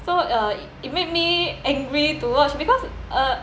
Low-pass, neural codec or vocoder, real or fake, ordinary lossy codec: none; none; real; none